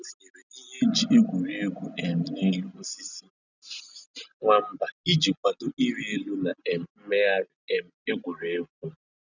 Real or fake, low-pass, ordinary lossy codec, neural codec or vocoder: real; 7.2 kHz; none; none